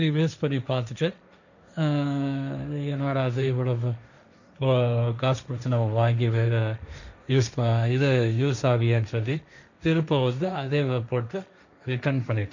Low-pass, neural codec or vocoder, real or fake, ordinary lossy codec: 7.2 kHz; codec, 16 kHz, 1.1 kbps, Voila-Tokenizer; fake; none